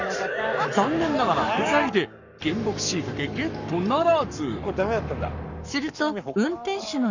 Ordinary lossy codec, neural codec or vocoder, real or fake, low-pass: none; codec, 44.1 kHz, 7.8 kbps, Pupu-Codec; fake; 7.2 kHz